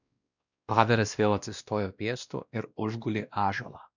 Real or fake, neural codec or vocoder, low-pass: fake; codec, 16 kHz, 1 kbps, X-Codec, WavLM features, trained on Multilingual LibriSpeech; 7.2 kHz